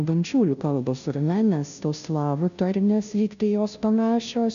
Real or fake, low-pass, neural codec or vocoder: fake; 7.2 kHz; codec, 16 kHz, 0.5 kbps, FunCodec, trained on Chinese and English, 25 frames a second